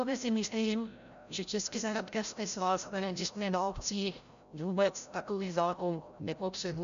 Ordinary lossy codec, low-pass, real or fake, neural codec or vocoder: MP3, 64 kbps; 7.2 kHz; fake; codec, 16 kHz, 0.5 kbps, FreqCodec, larger model